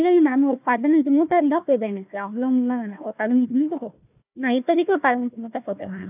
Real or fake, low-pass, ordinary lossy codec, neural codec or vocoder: fake; 3.6 kHz; none; codec, 16 kHz, 1 kbps, FunCodec, trained on Chinese and English, 50 frames a second